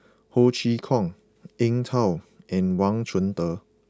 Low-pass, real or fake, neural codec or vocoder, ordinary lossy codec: none; real; none; none